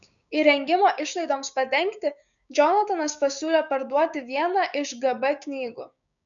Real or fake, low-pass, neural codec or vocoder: fake; 7.2 kHz; codec, 16 kHz, 6 kbps, DAC